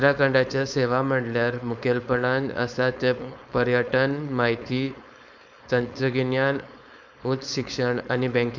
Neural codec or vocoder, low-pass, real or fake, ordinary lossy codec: codec, 16 kHz, 4.8 kbps, FACodec; 7.2 kHz; fake; none